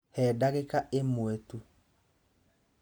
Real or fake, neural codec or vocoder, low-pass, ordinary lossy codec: real; none; none; none